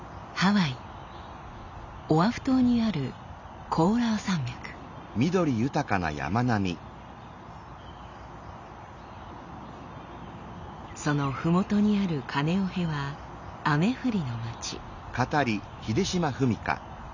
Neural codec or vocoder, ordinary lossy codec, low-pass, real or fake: none; none; 7.2 kHz; real